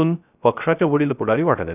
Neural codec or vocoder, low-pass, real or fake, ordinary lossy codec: codec, 16 kHz, 0.3 kbps, FocalCodec; 3.6 kHz; fake; none